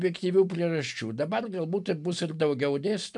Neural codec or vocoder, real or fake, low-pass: none; real; 10.8 kHz